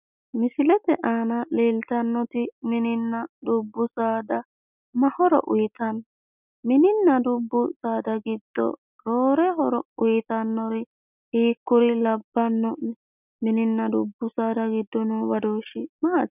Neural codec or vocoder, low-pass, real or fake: none; 3.6 kHz; real